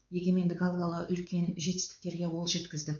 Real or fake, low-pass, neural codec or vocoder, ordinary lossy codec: fake; 7.2 kHz; codec, 16 kHz, 4 kbps, X-Codec, WavLM features, trained on Multilingual LibriSpeech; none